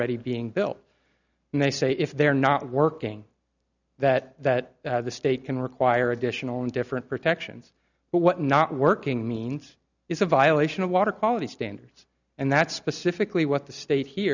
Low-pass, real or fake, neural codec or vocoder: 7.2 kHz; real; none